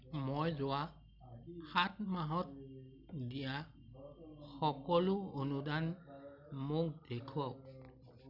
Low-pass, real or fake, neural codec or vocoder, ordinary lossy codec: 5.4 kHz; real; none; none